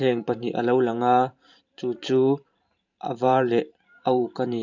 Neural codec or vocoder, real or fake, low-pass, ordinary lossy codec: vocoder, 44.1 kHz, 128 mel bands every 512 samples, BigVGAN v2; fake; 7.2 kHz; none